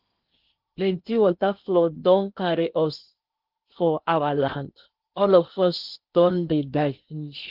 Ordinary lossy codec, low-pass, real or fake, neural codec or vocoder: Opus, 32 kbps; 5.4 kHz; fake; codec, 16 kHz in and 24 kHz out, 0.8 kbps, FocalCodec, streaming, 65536 codes